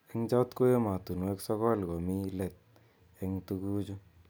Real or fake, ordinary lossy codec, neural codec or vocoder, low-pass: real; none; none; none